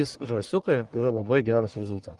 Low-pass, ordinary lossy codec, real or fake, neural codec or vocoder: 10.8 kHz; Opus, 24 kbps; fake; codec, 44.1 kHz, 1.7 kbps, Pupu-Codec